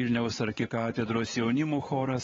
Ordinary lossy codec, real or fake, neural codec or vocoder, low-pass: AAC, 24 kbps; fake; codec, 16 kHz, 4 kbps, X-Codec, WavLM features, trained on Multilingual LibriSpeech; 7.2 kHz